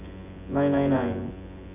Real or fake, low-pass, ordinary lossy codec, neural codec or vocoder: fake; 3.6 kHz; none; vocoder, 24 kHz, 100 mel bands, Vocos